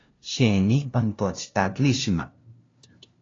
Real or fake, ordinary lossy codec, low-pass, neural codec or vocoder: fake; AAC, 32 kbps; 7.2 kHz; codec, 16 kHz, 0.5 kbps, FunCodec, trained on LibriTTS, 25 frames a second